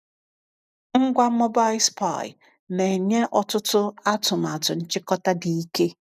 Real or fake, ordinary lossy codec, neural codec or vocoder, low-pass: real; none; none; 14.4 kHz